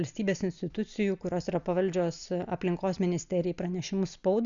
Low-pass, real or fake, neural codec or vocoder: 7.2 kHz; real; none